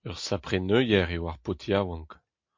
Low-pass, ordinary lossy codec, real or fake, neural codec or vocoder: 7.2 kHz; MP3, 48 kbps; real; none